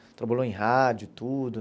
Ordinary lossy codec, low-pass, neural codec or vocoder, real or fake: none; none; none; real